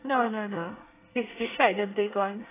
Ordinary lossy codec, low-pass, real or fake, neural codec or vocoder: AAC, 16 kbps; 3.6 kHz; fake; codec, 24 kHz, 1 kbps, SNAC